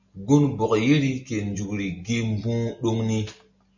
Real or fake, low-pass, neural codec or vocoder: real; 7.2 kHz; none